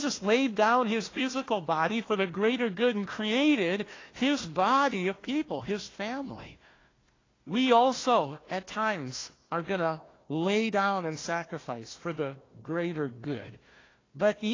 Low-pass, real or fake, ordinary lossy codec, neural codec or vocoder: 7.2 kHz; fake; AAC, 32 kbps; codec, 16 kHz, 1 kbps, FunCodec, trained on Chinese and English, 50 frames a second